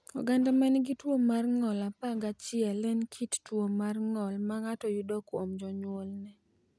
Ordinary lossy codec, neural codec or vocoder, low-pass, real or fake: none; none; none; real